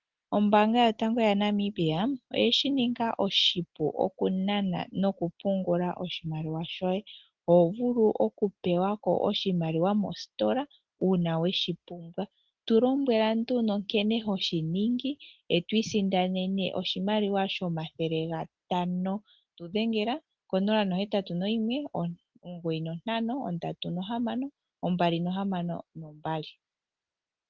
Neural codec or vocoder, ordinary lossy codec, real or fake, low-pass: none; Opus, 16 kbps; real; 7.2 kHz